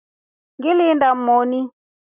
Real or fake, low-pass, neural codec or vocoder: real; 3.6 kHz; none